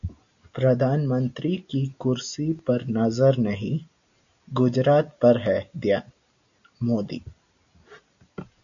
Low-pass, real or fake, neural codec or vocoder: 7.2 kHz; real; none